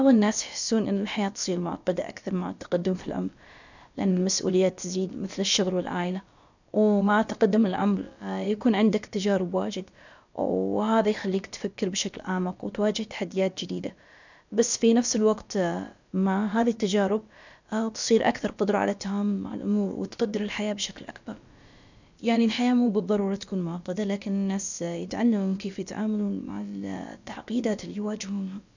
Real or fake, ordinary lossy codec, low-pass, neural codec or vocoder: fake; none; 7.2 kHz; codec, 16 kHz, about 1 kbps, DyCAST, with the encoder's durations